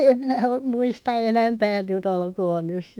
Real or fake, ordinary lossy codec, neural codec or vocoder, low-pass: fake; none; autoencoder, 48 kHz, 32 numbers a frame, DAC-VAE, trained on Japanese speech; 19.8 kHz